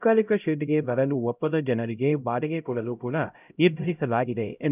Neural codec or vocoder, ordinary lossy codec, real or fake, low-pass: codec, 16 kHz, 0.5 kbps, X-Codec, HuBERT features, trained on LibriSpeech; none; fake; 3.6 kHz